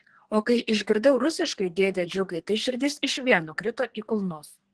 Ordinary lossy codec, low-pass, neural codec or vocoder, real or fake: Opus, 16 kbps; 10.8 kHz; codec, 32 kHz, 1.9 kbps, SNAC; fake